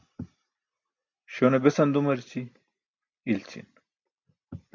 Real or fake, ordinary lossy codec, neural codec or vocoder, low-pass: real; MP3, 48 kbps; none; 7.2 kHz